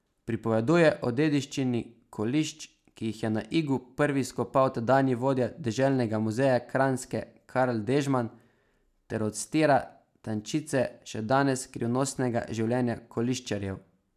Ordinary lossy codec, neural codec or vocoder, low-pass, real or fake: none; none; 14.4 kHz; real